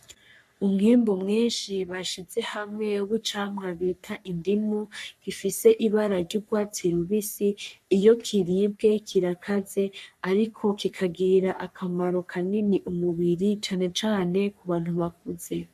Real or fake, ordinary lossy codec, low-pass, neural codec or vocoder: fake; MP3, 96 kbps; 14.4 kHz; codec, 44.1 kHz, 3.4 kbps, Pupu-Codec